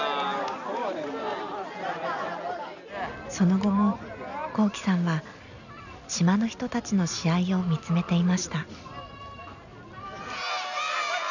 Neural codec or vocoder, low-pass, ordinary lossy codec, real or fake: none; 7.2 kHz; none; real